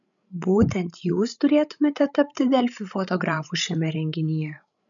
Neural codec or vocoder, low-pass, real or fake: codec, 16 kHz, 16 kbps, FreqCodec, larger model; 7.2 kHz; fake